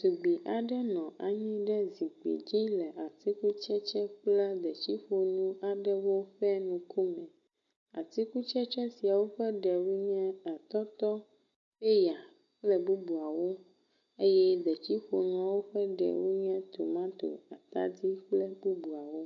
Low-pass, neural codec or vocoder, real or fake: 7.2 kHz; none; real